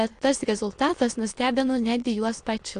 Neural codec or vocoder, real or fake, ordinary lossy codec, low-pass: autoencoder, 22.05 kHz, a latent of 192 numbers a frame, VITS, trained on many speakers; fake; AAC, 48 kbps; 9.9 kHz